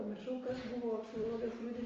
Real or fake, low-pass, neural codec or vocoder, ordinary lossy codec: real; 7.2 kHz; none; Opus, 32 kbps